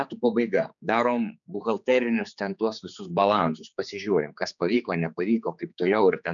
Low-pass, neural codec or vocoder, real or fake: 7.2 kHz; codec, 16 kHz, 4 kbps, X-Codec, HuBERT features, trained on general audio; fake